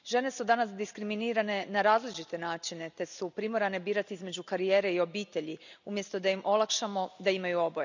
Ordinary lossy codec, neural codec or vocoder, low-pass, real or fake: none; none; 7.2 kHz; real